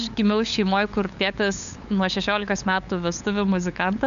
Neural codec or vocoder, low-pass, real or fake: codec, 16 kHz, 8 kbps, FunCodec, trained on LibriTTS, 25 frames a second; 7.2 kHz; fake